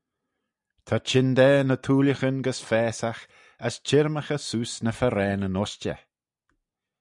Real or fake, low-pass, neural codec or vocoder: real; 10.8 kHz; none